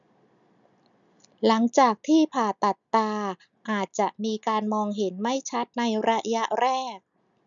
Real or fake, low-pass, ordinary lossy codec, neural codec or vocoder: real; 7.2 kHz; none; none